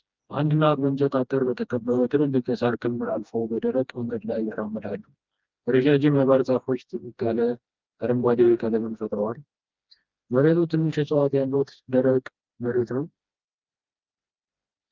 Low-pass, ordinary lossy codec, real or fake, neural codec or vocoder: 7.2 kHz; Opus, 24 kbps; fake; codec, 16 kHz, 1 kbps, FreqCodec, smaller model